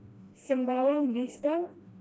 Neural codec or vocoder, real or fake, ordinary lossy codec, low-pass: codec, 16 kHz, 1 kbps, FreqCodec, smaller model; fake; none; none